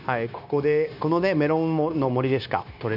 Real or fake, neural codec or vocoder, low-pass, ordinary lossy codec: fake; codec, 16 kHz, 0.9 kbps, LongCat-Audio-Codec; 5.4 kHz; none